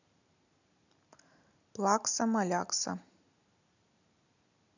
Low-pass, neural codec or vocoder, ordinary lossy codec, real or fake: 7.2 kHz; none; none; real